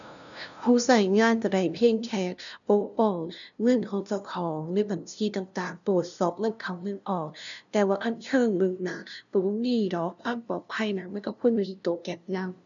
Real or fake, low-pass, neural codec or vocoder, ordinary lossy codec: fake; 7.2 kHz; codec, 16 kHz, 0.5 kbps, FunCodec, trained on LibriTTS, 25 frames a second; none